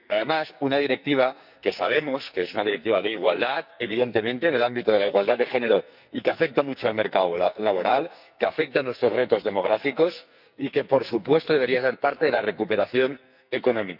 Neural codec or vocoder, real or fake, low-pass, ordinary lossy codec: codec, 44.1 kHz, 2.6 kbps, SNAC; fake; 5.4 kHz; none